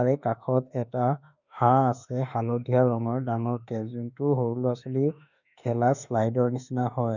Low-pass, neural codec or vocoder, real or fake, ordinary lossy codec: 7.2 kHz; autoencoder, 48 kHz, 32 numbers a frame, DAC-VAE, trained on Japanese speech; fake; none